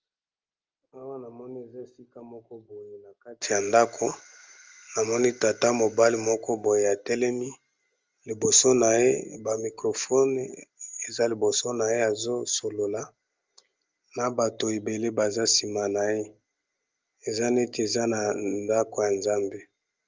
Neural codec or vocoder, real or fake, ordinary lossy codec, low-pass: none; real; Opus, 32 kbps; 7.2 kHz